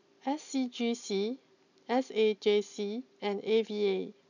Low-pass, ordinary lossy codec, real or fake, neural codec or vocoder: 7.2 kHz; none; real; none